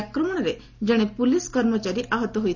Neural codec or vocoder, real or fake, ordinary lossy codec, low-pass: none; real; none; 7.2 kHz